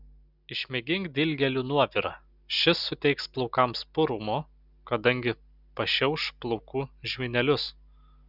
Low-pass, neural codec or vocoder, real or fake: 5.4 kHz; none; real